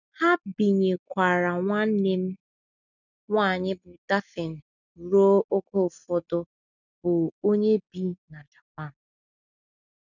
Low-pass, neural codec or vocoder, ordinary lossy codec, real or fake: 7.2 kHz; none; none; real